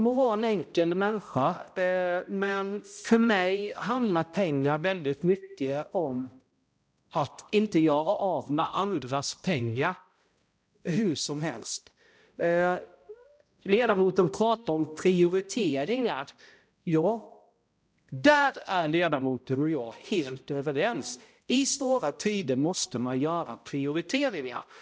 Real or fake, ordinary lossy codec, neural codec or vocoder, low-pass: fake; none; codec, 16 kHz, 0.5 kbps, X-Codec, HuBERT features, trained on balanced general audio; none